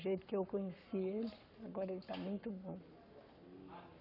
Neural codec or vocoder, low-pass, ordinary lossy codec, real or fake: codec, 44.1 kHz, 7.8 kbps, Pupu-Codec; 5.4 kHz; none; fake